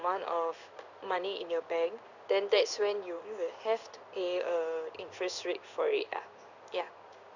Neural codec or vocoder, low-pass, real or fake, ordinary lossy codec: codec, 16 kHz in and 24 kHz out, 1 kbps, XY-Tokenizer; 7.2 kHz; fake; none